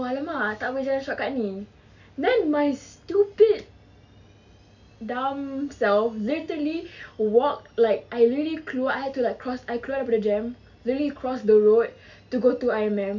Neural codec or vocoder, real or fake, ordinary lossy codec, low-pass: none; real; none; 7.2 kHz